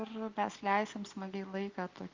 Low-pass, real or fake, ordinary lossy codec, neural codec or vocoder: 7.2 kHz; real; Opus, 24 kbps; none